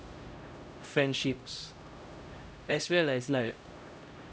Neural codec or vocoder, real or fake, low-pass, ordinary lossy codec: codec, 16 kHz, 0.5 kbps, X-Codec, HuBERT features, trained on LibriSpeech; fake; none; none